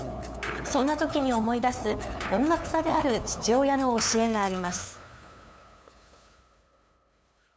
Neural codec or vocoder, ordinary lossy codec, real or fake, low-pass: codec, 16 kHz, 2 kbps, FunCodec, trained on LibriTTS, 25 frames a second; none; fake; none